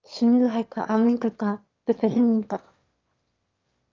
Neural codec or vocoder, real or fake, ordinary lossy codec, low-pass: autoencoder, 22.05 kHz, a latent of 192 numbers a frame, VITS, trained on one speaker; fake; Opus, 24 kbps; 7.2 kHz